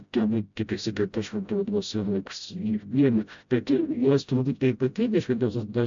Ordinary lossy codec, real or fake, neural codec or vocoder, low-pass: AAC, 64 kbps; fake; codec, 16 kHz, 0.5 kbps, FreqCodec, smaller model; 7.2 kHz